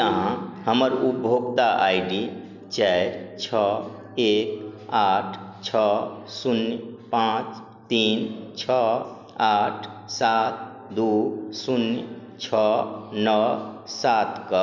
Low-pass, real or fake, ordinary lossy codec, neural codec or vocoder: 7.2 kHz; real; none; none